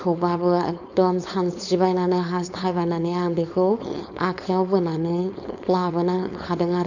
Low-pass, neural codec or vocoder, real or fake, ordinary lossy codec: 7.2 kHz; codec, 16 kHz, 4.8 kbps, FACodec; fake; none